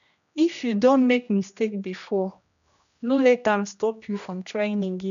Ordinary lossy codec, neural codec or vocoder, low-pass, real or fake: MP3, 96 kbps; codec, 16 kHz, 1 kbps, X-Codec, HuBERT features, trained on general audio; 7.2 kHz; fake